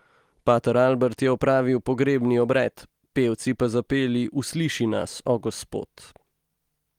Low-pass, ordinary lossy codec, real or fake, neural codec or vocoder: 19.8 kHz; Opus, 24 kbps; real; none